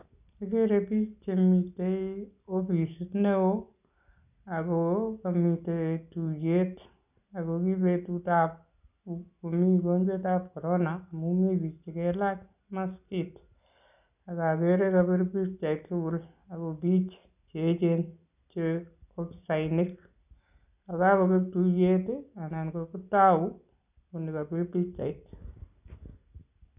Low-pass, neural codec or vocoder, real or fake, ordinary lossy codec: 3.6 kHz; none; real; none